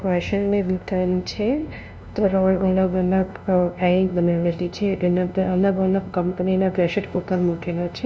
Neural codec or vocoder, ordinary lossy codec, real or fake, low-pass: codec, 16 kHz, 0.5 kbps, FunCodec, trained on LibriTTS, 25 frames a second; none; fake; none